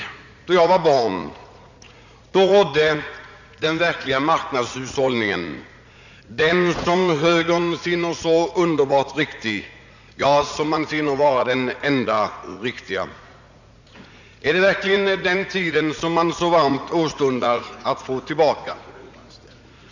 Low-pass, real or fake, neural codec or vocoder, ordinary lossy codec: 7.2 kHz; fake; vocoder, 44.1 kHz, 80 mel bands, Vocos; none